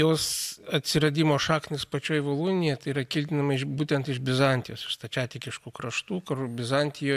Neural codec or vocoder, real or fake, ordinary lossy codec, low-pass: none; real; AAC, 96 kbps; 14.4 kHz